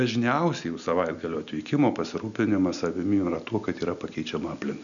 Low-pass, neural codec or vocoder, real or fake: 7.2 kHz; none; real